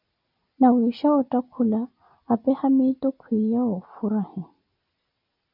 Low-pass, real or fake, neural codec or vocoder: 5.4 kHz; real; none